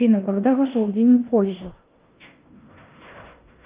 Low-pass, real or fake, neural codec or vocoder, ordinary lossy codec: 3.6 kHz; fake; codec, 16 kHz in and 24 kHz out, 0.9 kbps, LongCat-Audio-Codec, four codebook decoder; Opus, 32 kbps